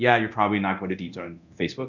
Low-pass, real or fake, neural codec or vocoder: 7.2 kHz; fake; codec, 24 kHz, 0.9 kbps, DualCodec